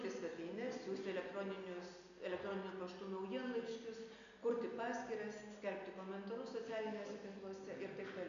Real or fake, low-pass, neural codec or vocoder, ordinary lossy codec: real; 7.2 kHz; none; MP3, 96 kbps